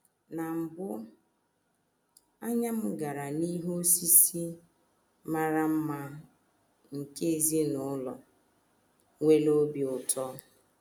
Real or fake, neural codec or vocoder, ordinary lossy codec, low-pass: real; none; none; none